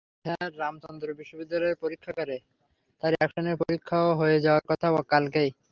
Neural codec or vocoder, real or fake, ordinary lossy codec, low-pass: none; real; Opus, 24 kbps; 7.2 kHz